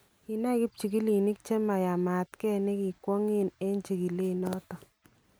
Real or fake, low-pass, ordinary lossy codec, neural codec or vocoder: real; none; none; none